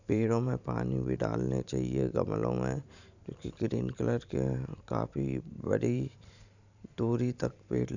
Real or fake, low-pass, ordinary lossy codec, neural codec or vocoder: fake; 7.2 kHz; none; vocoder, 44.1 kHz, 128 mel bands every 512 samples, BigVGAN v2